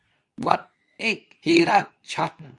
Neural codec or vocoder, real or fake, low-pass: codec, 24 kHz, 0.9 kbps, WavTokenizer, medium speech release version 2; fake; 10.8 kHz